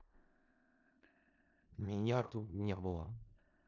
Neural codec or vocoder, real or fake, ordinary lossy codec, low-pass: codec, 16 kHz in and 24 kHz out, 0.4 kbps, LongCat-Audio-Codec, four codebook decoder; fake; none; 7.2 kHz